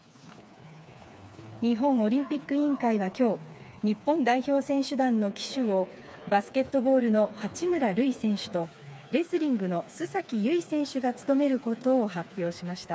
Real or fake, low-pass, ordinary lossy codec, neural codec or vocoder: fake; none; none; codec, 16 kHz, 4 kbps, FreqCodec, smaller model